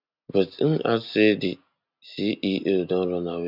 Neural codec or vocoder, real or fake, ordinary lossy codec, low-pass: none; real; none; 5.4 kHz